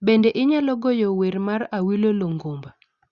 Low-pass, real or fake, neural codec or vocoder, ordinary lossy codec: 7.2 kHz; real; none; Opus, 64 kbps